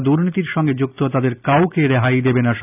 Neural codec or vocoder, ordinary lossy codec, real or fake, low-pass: none; none; real; 3.6 kHz